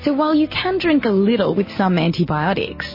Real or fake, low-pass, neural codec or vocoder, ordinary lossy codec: real; 5.4 kHz; none; MP3, 24 kbps